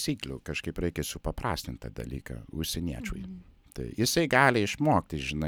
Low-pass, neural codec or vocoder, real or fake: 19.8 kHz; none; real